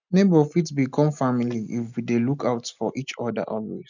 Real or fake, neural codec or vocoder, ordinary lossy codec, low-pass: real; none; none; 7.2 kHz